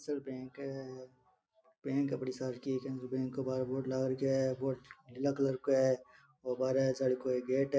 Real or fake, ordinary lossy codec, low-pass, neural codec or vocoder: real; none; none; none